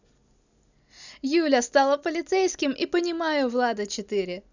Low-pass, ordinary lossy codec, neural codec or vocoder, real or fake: 7.2 kHz; none; none; real